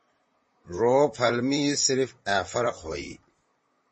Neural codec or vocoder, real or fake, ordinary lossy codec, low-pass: vocoder, 44.1 kHz, 128 mel bands, Pupu-Vocoder; fake; MP3, 32 kbps; 10.8 kHz